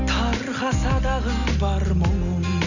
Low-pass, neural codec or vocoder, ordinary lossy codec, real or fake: 7.2 kHz; none; none; real